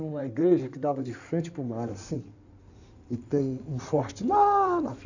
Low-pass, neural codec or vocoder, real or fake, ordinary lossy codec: 7.2 kHz; codec, 16 kHz in and 24 kHz out, 2.2 kbps, FireRedTTS-2 codec; fake; none